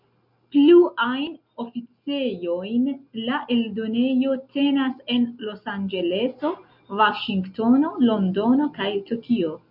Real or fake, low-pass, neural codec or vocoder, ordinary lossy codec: real; 5.4 kHz; none; MP3, 48 kbps